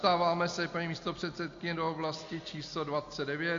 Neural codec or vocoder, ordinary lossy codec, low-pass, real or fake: none; AAC, 48 kbps; 7.2 kHz; real